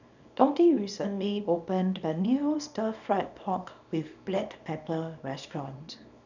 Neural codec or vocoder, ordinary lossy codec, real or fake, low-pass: codec, 24 kHz, 0.9 kbps, WavTokenizer, small release; none; fake; 7.2 kHz